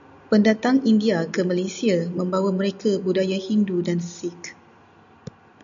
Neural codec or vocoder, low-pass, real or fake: none; 7.2 kHz; real